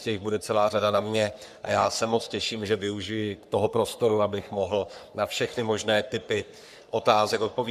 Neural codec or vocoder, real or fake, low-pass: codec, 44.1 kHz, 3.4 kbps, Pupu-Codec; fake; 14.4 kHz